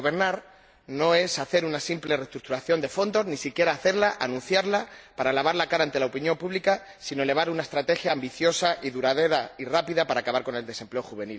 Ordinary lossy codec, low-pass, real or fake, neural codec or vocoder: none; none; real; none